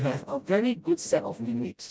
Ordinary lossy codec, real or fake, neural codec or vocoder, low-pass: none; fake; codec, 16 kHz, 0.5 kbps, FreqCodec, smaller model; none